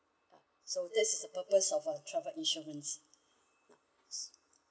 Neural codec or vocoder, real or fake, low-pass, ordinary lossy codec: none; real; none; none